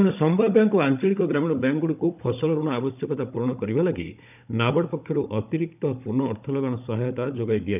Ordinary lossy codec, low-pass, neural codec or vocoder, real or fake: none; 3.6 kHz; codec, 16 kHz, 16 kbps, FunCodec, trained on Chinese and English, 50 frames a second; fake